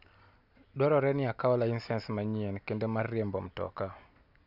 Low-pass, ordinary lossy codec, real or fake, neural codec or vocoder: 5.4 kHz; none; real; none